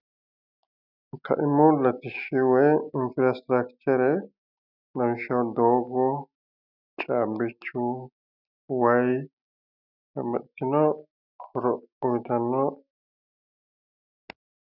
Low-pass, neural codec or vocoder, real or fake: 5.4 kHz; none; real